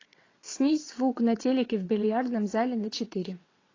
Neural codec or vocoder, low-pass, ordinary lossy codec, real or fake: vocoder, 22.05 kHz, 80 mel bands, WaveNeXt; 7.2 kHz; AAC, 32 kbps; fake